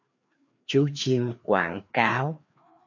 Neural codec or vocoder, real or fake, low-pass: codec, 16 kHz, 2 kbps, FreqCodec, larger model; fake; 7.2 kHz